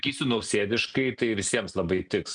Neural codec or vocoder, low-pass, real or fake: none; 9.9 kHz; real